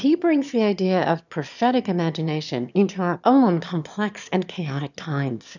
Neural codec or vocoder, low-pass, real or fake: autoencoder, 22.05 kHz, a latent of 192 numbers a frame, VITS, trained on one speaker; 7.2 kHz; fake